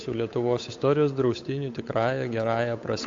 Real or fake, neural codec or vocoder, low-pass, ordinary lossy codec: fake; codec, 16 kHz, 8 kbps, FunCodec, trained on Chinese and English, 25 frames a second; 7.2 kHz; AAC, 48 kbps